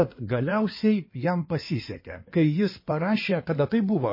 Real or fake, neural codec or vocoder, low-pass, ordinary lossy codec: fake; codec, 16 kHz in and 24 kHz out, 2.2 kbps, FireRedTTS-2 codec; 5.4 kHz; MP3, 24 kbps